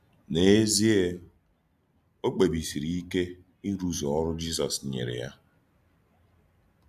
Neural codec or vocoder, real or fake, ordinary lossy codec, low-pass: none; real; none; 14.4 kHz